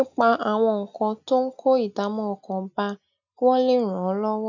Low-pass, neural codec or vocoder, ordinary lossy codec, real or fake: 7.2 kHz; none; none; real